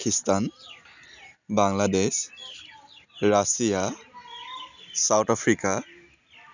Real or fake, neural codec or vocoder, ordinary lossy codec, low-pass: real; none; none; 7.2 kHz